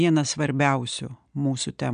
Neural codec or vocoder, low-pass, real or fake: none; 9.9 kHz; real